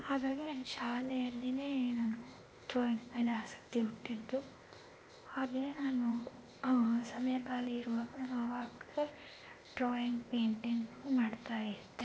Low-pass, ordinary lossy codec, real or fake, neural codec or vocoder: none; none; fake; codec, 16 kHz, 0.8 kbps, ZipCodec